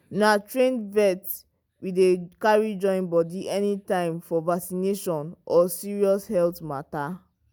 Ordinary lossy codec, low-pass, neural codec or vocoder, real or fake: none; none; none; real